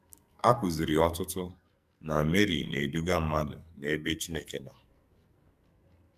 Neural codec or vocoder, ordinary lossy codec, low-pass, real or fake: codec, 44.1 kHz, 2.6 kbps, SNAC; none; 14.4 kHz; fake